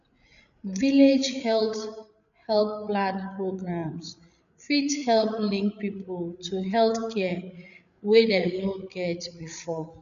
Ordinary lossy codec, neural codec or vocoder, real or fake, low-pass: none; codec, 16 kHz, 8 kbps, FreqCodec, larger model; fake; 7.2 kHz